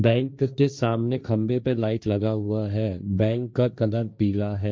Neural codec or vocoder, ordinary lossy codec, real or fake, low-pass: codec, 16 kHz, 1.1 kbps, Voila-Tokenizer; none; fake; none